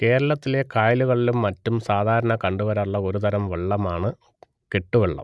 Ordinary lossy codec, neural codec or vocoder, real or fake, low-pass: none; none; real; none